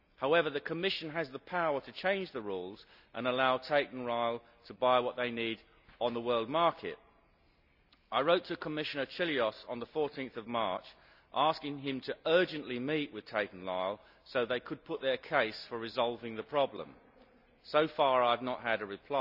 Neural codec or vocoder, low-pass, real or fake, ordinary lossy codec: none; 5.4 kHz; real; none